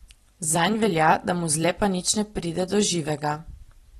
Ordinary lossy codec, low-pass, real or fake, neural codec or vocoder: AAC, 32 kbps; 19.8 kHz; fake; vocoder, 44.1 kHz, 128 mel bands every 256 samples, BigVGAN v2